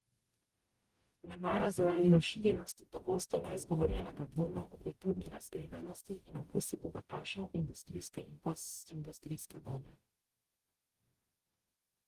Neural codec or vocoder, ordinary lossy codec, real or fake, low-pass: codec, 44.1 kHz, 0.9 kbps, DAC; Opus, 24 kbps; fake; 14.4 kHz